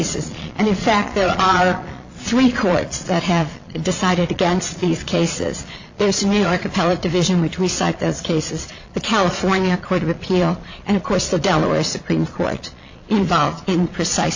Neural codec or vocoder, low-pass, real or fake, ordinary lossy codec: vocoder, 22.05 kHz, 80 mel bands, Vocos; 7.2 kHz; fake; AAC, 48 kbps